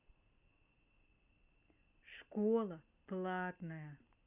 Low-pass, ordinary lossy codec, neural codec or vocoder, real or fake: 3.6 kHz; none; none; real